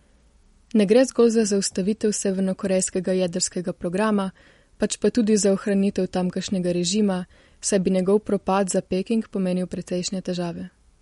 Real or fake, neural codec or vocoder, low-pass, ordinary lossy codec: real; none; 19.8 kHz; MP3, 48 kbps